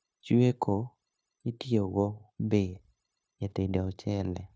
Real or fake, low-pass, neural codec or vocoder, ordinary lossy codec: fake; none; codec, 16 kHz, 0.9 kbps, LongCat-Audio-Codec; none